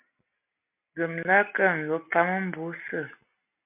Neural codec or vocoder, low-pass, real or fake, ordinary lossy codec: none; 3.6 kHz; real; MP3, 32 kbps